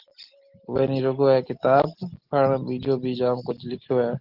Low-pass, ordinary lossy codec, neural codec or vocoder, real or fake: 5.4 kHz; Opus, 16 kbps; none; real